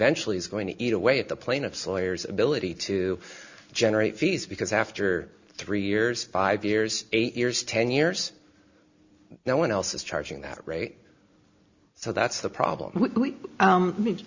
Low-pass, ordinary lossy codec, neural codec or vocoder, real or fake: 7.2 kHz; Opus, 64 kbps; none; real